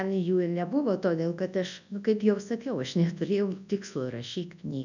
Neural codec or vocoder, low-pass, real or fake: codec, 24 kHz, 0.9 kbps, WavTokenizer, large speech release; 7.2 kHz; fake